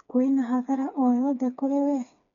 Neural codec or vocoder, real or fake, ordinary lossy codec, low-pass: codec, 16 kHz, 4 kbps, FreqCodec, smaller model; fake; none; 7.2 kHz